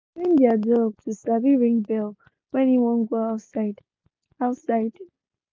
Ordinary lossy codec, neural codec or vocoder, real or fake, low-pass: none; none; real; none